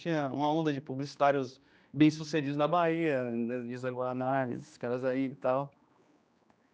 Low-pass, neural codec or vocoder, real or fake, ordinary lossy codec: none; codec, 16 kHz, 2 kbps, X-Codec, HuBERT features, trained on general audio; fake; none